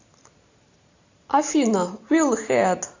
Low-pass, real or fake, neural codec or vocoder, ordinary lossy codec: 7.2 kHz; real; none; AAC, 48 kbps